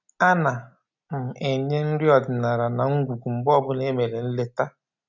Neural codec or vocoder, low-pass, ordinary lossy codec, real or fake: none; 7.2 kHz; none; real